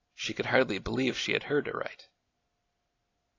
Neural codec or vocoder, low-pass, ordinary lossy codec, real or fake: none; 7.2 kHz; AAC, 32 kbps; real